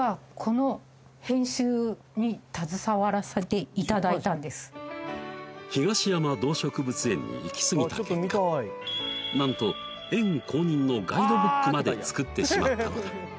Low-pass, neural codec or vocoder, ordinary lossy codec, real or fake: none; none; none; real